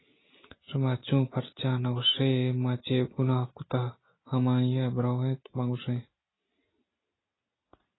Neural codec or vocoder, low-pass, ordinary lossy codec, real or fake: none; 7.2 kHz; AAC, 16 kbps; real